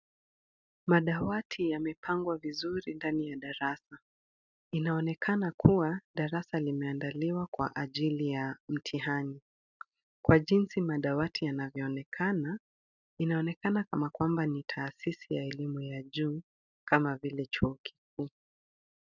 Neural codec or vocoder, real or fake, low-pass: none; real; 7.2 kHz